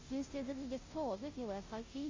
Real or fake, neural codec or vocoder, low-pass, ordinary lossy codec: fake; codec, 16 kHz, 0.5 kbps, FunCodec, trained on Chinese and English, 25 frames a second; 7.2 kHz; MP3, 32 kbps